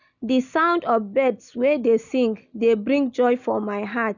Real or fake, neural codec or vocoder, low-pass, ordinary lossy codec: real; none; 7.2 kHz; none